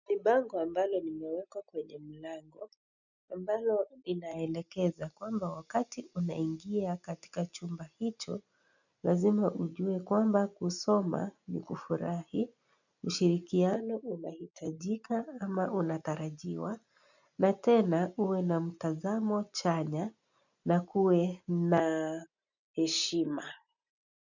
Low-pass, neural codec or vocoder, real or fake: 7.2 kHz; none; real